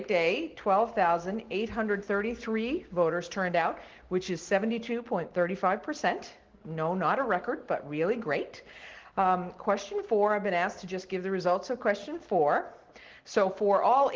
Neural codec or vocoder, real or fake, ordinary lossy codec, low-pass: none; real; Opus, 16 kbps; 7.2 kHz